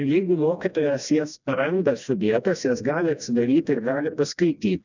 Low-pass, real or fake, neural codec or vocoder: 7.2 kHz; fake; codec, 16 kHz, 1 kbps, FreqCodec, smaller model